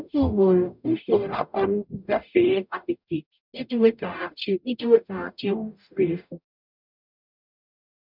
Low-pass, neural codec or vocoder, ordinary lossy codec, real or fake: 5.4 kHz; codec, 44.1 kHz, 0.9 kbps, DAC; none; fake